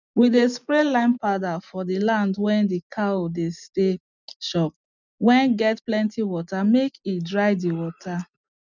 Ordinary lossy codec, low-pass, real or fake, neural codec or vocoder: none; 7.2 kHz; real; none